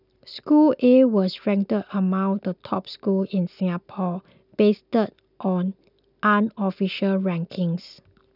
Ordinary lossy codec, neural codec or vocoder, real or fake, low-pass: none; none; real; 5.4 kHz